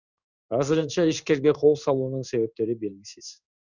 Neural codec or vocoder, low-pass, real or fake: codec, 16 kHz in and 24 kHz out, 1 kbps, XY-Tokenizer; 7.2 kHz; fake